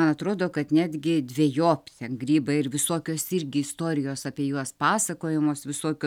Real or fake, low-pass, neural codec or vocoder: real; 19.8 kHz; none